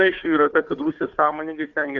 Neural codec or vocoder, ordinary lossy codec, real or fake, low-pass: codec, 16 kHz, 4 kbps, FunCodec, trained on Chinese and English, 50 frames a second; Opus, 64 kbps; fake; 7.2 kHz